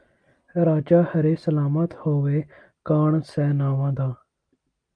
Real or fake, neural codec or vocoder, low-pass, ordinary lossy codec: real; none; 9.9 kHz; Opus, 32 kbps